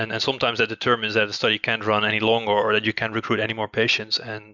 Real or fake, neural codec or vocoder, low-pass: real; none; 7.2 kHz